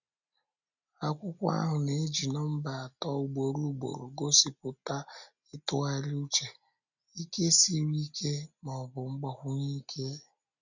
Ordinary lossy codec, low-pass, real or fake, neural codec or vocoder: none; 7.2 kHz; real; none